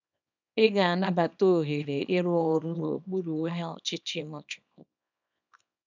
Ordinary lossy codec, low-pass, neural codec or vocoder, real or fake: none; 7.2 kHz; codec, 24 kHz, 0.9 kbps, WavTokenizer, small release; fake